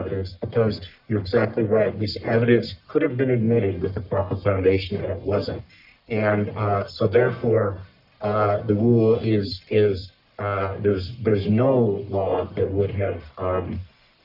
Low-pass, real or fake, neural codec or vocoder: 5.4 kHz; fake; codec, 44.1 kHz, 1.7 kbps, Pupu-Codec